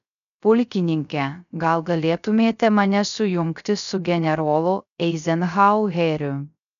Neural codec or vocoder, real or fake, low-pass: codec, 16 kHz, 0.3 kbps, FocalCodec; fake; 7.2 kHz